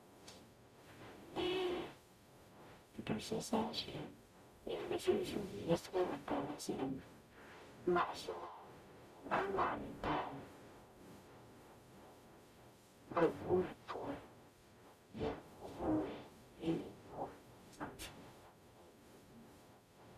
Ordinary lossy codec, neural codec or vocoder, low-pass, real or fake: none; codec, 44.1 kHz, 0.9 kbps, DAC; 14.4 kHz; fake